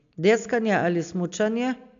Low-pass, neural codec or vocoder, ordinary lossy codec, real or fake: 7.2 kHz; none; AAC, 64 kbps; real